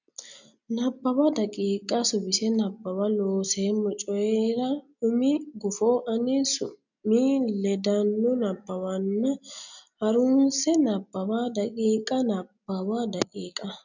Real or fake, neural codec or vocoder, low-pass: real; none; 7.2 kHz